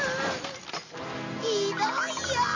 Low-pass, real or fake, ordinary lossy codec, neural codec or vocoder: 7.2 kHz; real; MP3, 32 kbps; none